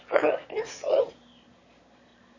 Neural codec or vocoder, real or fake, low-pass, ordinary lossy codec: autoencoder, 22.05 kHz, a latent of 192 numbers a frame, VITS, trained on one speaker; fake; 7.2 kHz; MP3, 32 kbps